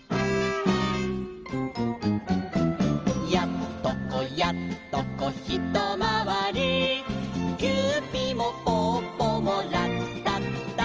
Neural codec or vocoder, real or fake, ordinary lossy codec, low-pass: none; real; Opus, 24 kbps; 7.2 kHz